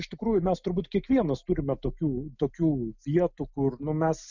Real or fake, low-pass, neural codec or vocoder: real; 7.2 kHz; none